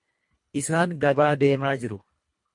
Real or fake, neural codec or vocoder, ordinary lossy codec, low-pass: fake; codec, 24 kHz, 1.5 kbps, HILCodec; MP3, 48 kbps; 10.8 kHz